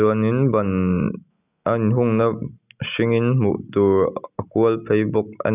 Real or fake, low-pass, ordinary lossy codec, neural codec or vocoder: real; 3.6 kHz; none; none